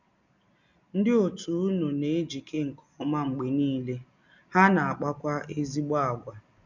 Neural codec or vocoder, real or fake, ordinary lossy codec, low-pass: none; real; none; 7.2 kHz